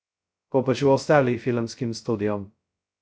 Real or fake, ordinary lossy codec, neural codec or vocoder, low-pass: fake; none; codec, 16 kHz, 0.2 kbps, FocalCodec; none